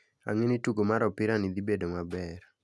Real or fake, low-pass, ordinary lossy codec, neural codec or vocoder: real; none; none; none